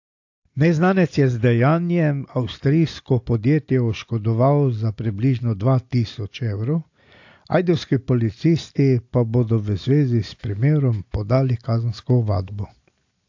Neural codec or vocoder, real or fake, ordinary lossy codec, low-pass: none; real; AAC, 48 kbps; 7.2 kHz